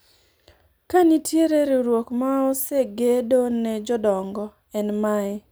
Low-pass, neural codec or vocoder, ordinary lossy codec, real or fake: none; none; none; real